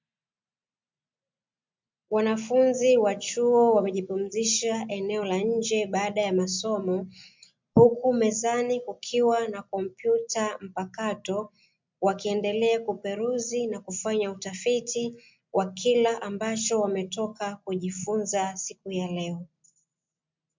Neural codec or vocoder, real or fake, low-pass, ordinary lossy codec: none; real; 7.2 kHz; MP3, 64 kbps